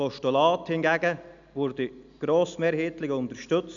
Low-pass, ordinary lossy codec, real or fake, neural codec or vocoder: 7.2 kHz; none; real; none